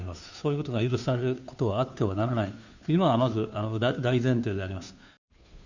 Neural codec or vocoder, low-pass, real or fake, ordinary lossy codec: codec, 16 kHz, 2 kbps, FunCodec, trained on Chinese and English, 25 frames a second; 7.2 kHz; fake; none